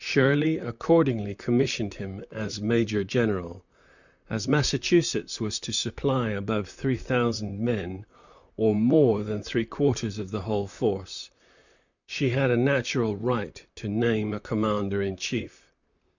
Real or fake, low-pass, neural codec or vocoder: fake; 7.2 kHz; vocoder, 44.1 kHz, 128 mel bands, Pupu-Vocoder